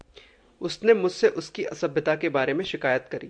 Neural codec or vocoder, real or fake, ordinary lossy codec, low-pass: none; real; MP3, 64 kbps; 9.9 kHz